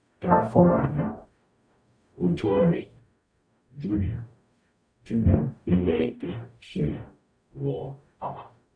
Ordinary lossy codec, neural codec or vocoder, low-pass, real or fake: none; codec, 44.1 kHz, 0.9 kbps, DAC; 9.9 kHz; fake